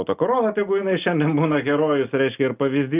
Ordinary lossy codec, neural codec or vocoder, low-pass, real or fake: Opus, 24 kbps; none; 3.6 kHz; real